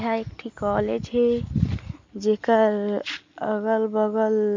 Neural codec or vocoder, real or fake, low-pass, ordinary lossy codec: none; real; 7.2 kHz; MP3, 64 kbps